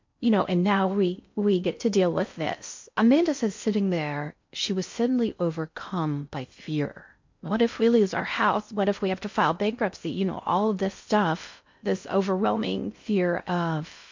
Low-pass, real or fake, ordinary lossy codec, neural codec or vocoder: 7.2 kHz; fake; MP3, 48 kbps; codec, 16 kHz in and 24 kHz out, 0.6 kbps, FocalCodec, streaming, 4096 codes